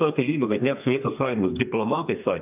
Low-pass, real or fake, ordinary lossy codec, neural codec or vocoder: 3.6 kHz; fake; AAC, 32 kbps; codec, 32 kHz, 1.9 kbps, SNAC